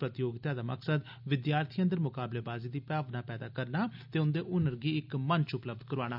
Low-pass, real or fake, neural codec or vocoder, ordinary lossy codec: 5.4 kHz; real; none; none